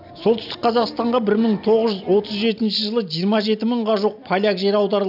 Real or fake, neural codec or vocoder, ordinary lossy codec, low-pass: real; none; none; 5.4 kHz